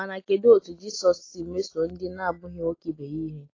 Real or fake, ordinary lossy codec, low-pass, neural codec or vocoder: real; AAC, 32 kbps; 7.2 kHz; none